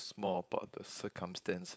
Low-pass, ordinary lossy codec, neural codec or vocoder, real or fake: none; none; codec, 16 kHz, 4.8 kbps, FACodec; fake